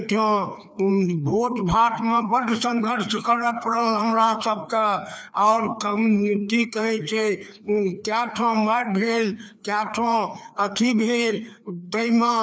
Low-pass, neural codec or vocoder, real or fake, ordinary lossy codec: none; codec, 16 kHz, 2 kbps, FreqCodec, larger model; fake; none